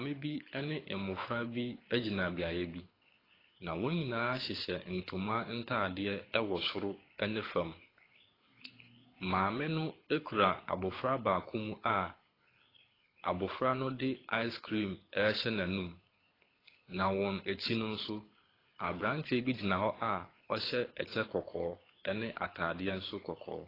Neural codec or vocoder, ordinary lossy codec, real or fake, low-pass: codec, 24 kHz, 6 kbps, HILCodec; AAC, 24 kbps; fake; 5.4 kHz